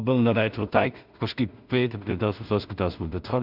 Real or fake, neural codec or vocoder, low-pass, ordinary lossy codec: fake; codec, 16 kHz in and 24 kHz out, 0.4 kbps, LongCat-Audio-Codec, two codebook decoder; 5.4 kHz; Opus, 64 kbps